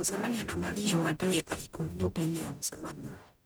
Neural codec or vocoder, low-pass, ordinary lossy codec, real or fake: codec, 44.1 kHz, 0.9 kbps, DAC; none; none; fake